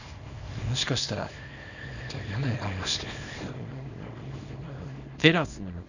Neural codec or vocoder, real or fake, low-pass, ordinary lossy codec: codec, 24 kHz, 0.9 kbps, WavTokenizer, small release; fake; 7.2 kHz; none